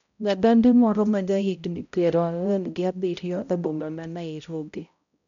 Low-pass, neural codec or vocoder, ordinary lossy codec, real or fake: 7.2 kHz; codec, 16 kHz, 0.5 kbps, X-Codec, HuBERT features, trained on balanced general audio; none; fake